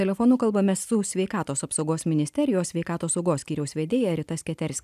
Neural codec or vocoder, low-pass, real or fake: none; 14.4 kHz; real